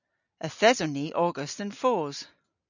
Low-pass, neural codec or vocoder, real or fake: 7.2 kHz; none; real